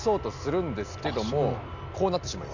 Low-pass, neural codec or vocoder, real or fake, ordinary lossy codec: 7.2 kHz; none; real; none